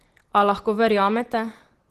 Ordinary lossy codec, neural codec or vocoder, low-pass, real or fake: Opus, 16 kbps; none; 14.4 kHz; real